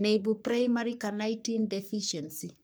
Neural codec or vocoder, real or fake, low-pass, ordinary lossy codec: codec, 44.1 kHz, 3.4 kbps, Pupu-Codec; fake; none; none